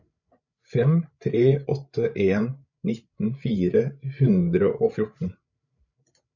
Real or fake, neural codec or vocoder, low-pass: fake; codec, 16 kHz, 8 kbps, FreqCodec, larger model; 7.2 kHz